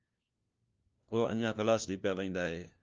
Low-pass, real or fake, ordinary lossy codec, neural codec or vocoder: 7.2 kHz; fake; Opus, 24 kbps; codec, 16 kHz, 1 kbps, FunCodec, trained on LibriTTS, 50 frames a second